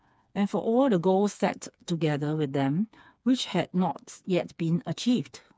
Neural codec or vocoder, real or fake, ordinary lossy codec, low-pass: codec, 16 kHz, 4 kbps, FreqCodec, smaller model; fake; none; none